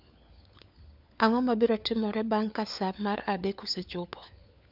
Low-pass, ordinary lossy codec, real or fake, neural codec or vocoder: 5.4 kHz; none; fake; codec, 16 kHz, 4 kbps, FunCodec, trained on LibriTTS, 50 frames a second